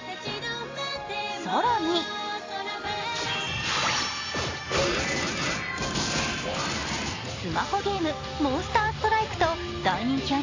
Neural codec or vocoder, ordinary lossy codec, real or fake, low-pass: none; AAC, 32 kbps; real; 7.2 kHz